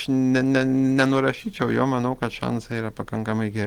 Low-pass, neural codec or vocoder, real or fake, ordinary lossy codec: 19.8 kHz; none; real; Opus, 16 kbps